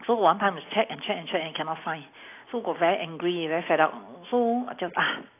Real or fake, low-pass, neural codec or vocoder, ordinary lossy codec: real; 3.6 kHz; none; AAC, 24 kbps